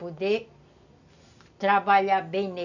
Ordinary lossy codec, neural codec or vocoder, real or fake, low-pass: none; none; real; 7.2 kHz